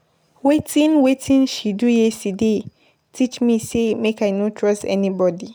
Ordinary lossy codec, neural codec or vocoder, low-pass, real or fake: none; none; none; real